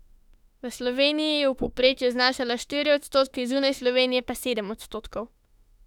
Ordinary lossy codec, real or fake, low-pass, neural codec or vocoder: none; fake; 19.8 kHz; autoencoder, 48 kHz, 32 numbers a frame, DAC-VAE, trained on Japanese speech